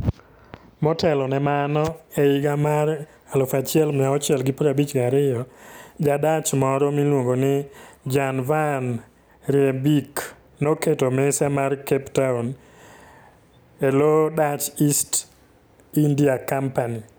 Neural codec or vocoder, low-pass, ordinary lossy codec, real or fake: none; none; none; real